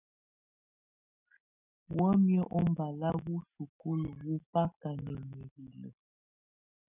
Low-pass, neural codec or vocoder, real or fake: 3.6 kHz; none; real